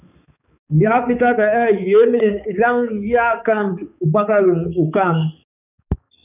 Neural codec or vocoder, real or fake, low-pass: codec, 16 kHz, 4 kbps, X-Codec, HuBERT features, trained on balanced general audio; fake; 3.6 kHz